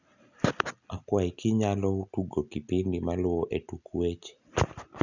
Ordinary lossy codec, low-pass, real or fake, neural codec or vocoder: none; 7.2 kHz; real; none